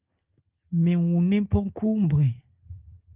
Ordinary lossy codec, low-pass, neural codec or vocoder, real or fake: Opus, 32 kbps; 3.6 kHz; none; real